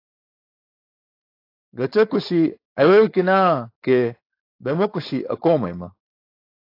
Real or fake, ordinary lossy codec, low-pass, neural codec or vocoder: fake; AAC, 32 kbps; 5.4 kHz; codec, 16 kHz, 4.8 kbps, FACodec